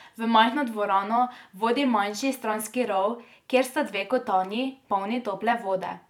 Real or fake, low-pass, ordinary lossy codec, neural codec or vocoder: fake; 19.8 kHz; none; vocoder, 44.1 kHz, 128 mel bands every 512 samples, BigVGAN v2